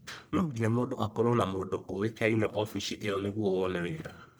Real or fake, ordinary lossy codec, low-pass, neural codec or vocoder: fake; none; none; codec, 44.1 kHz, 1.7 kbps, Pupu-Codec